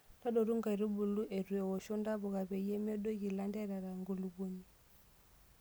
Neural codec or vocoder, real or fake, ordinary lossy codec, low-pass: none; real; none; none